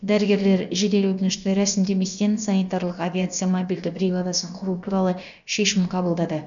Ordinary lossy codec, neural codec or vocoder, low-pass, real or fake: none; codec, 16 kHz, about 1 kbps, DyCAST, with the encoder's durations; 7.2 kHz; fake